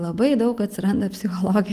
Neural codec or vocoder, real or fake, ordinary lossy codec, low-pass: none; real; Opus, 24 kbps; 14.4 kHz